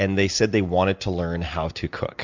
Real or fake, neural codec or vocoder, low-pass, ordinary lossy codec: real; none; 7.2 kHz; MP3, 64 kbps